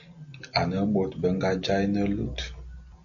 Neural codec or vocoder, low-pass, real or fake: none; 7.2 kHz; real